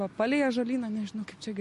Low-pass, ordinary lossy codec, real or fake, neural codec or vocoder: 14.4 kHz; MP3, 48 kbps; real; none